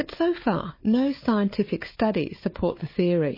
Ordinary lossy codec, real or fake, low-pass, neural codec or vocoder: MP3, 24 kbps; fake; 5.4 kHz; codec, 16 kHz, 4 kbps, FunCodec, trained on Chinese and English, 50 frames a second